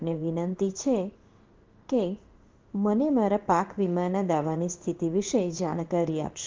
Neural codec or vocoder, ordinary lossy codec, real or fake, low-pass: codec, 16 kHz in and 24 kHz out, 1 kbps, XY-Tokenizer; Opus, 32 kbps; fake; 7.2 kHz